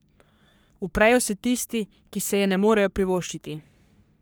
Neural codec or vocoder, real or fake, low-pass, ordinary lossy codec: codec, 44.1 kHz, 3.4 kbps, Pupu-Codec; fake; none; none